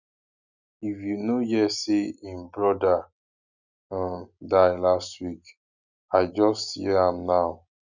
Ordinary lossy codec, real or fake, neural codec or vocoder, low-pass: none; real; none; 7.2 kHz